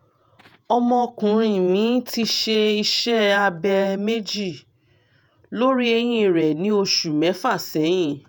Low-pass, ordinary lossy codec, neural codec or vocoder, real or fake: none; none; vocoder, 48 kHz, 128 mel bands, Vocos; fake